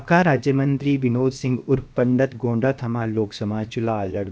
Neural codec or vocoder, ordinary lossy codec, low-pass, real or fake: codec, 16 kHz, about 1 kbps, DyCAST, with the encoder's durations; none; none; fake